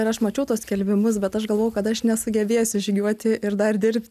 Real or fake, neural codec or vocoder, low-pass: real; none; 14.4 kHz